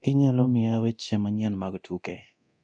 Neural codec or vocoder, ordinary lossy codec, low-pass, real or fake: codec, 24 kHz, 0.9 kbps, DualCodec; none; 9.9 kHz; fake